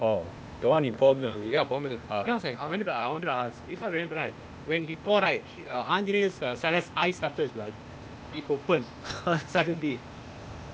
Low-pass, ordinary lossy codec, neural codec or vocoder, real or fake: none; none; codec, 16 kHz, 0.8 kbps, ZipCodec; fake